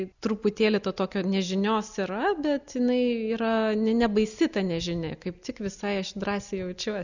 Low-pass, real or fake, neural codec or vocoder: 7.2 kHz; real; none